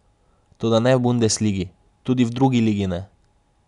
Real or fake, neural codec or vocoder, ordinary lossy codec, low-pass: real; none; none; 10.8 kHz